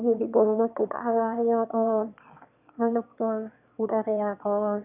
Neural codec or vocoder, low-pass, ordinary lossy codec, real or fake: autoencoder, 22.05 kHz, a latent of 192 numbers a frame, VITS, trained on one speaker; 3.6 kHz; none; fake